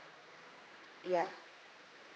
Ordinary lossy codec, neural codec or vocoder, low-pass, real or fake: none; codec, 16 kHz, 2 kbps, X-Codec, HuBERT features, trained on general audio; none; fake